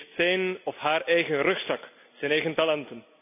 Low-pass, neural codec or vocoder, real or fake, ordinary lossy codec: 3.6 kHz; none; real; none